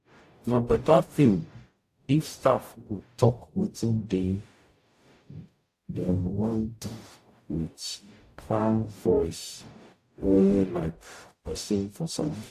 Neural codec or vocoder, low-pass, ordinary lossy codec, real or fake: codec, 44.1 kHz, 0.9 kbps, DAC; 14.4 kHz; AAC, 64 kbps; fake